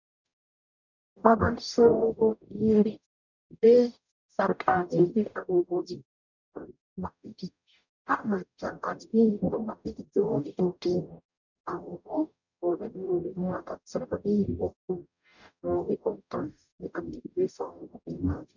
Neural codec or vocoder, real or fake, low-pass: codec, 44.1 kHz, 0.9 kbps, DAC; fake; 7.2 kHz